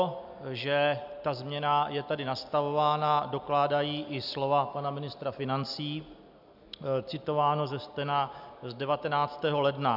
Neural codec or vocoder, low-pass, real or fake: none; 5.4 kHz; real